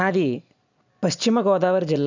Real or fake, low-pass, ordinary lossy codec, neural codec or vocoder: real; 7.2 kHz; none; none